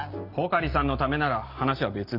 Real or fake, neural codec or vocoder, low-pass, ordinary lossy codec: real; none; 5.4 kHz; none